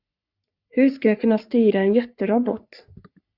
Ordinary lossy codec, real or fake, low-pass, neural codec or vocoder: Opus, 64 kbps; fake; 5.4 kHz; codec, 44.1 kHz, 3.4 kbps, Pupu-Codec